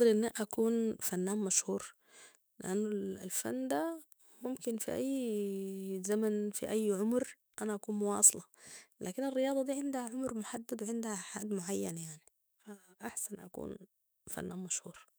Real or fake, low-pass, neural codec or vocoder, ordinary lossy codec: fake; none; autoencoder, 48 kHz, 128 numbers a frame, DAC-VAE, trained on Japanese speech; none